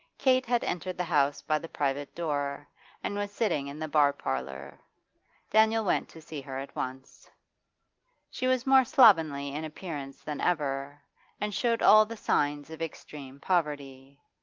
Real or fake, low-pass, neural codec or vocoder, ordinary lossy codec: real; 7.2 kHz; none; Opus, 32 kbps